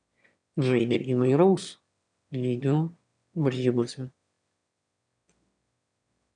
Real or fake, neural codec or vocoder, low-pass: fake; autoencoder, 22.05 kHz, a latent of 192 numbers a frame, VITS, trained on one speaker; 9.9 kHz